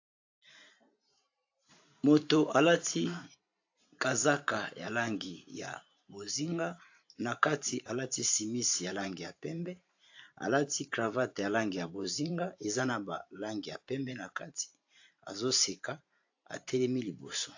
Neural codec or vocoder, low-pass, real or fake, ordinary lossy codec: vocoder, 44.1 kHz, 128 mel bands every 512 samples, BigVGAN v2; 7.2 kHz; fake; AAC, 48 kbps